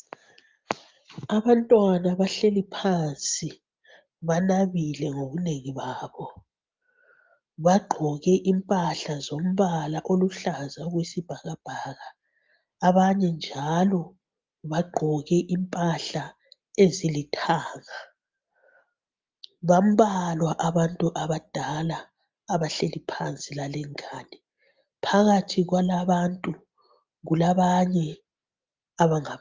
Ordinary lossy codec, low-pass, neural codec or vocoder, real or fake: Opus, 32 kbps; 7.2 kHz; none; real